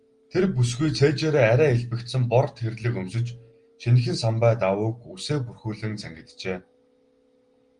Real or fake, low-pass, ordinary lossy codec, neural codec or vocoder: real; 9.9 kHz; Opus, 24 kbps; none